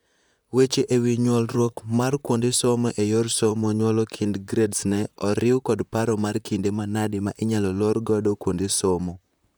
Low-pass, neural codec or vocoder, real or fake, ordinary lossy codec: none; vocoder, 44.1 kHz, 128 mel bands, Pupu-Vocoder; fake; none